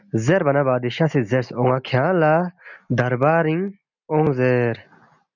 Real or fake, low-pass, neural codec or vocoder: real; 7.2 kHz; none